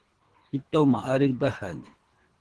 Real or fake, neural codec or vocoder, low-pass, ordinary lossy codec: fake; codec, 24 kHz, 3 kbps, HILCodec; 10.8 kHz; Opus, 16 kbps